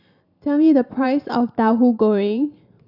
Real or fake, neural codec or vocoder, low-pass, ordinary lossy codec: fake; vocoder, 44.1 kHz, 128 mel bands every 512 samples, BigVGAN v2; 5.4 kHz; none